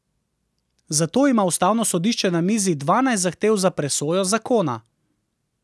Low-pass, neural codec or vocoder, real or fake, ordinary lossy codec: none; none; real; none